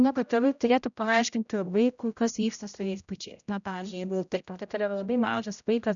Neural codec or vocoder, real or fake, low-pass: codec, 16 kHz, 0.5 kbps, X-Codec, HuBERT features, trained on general audio; fake; 7.2 kHz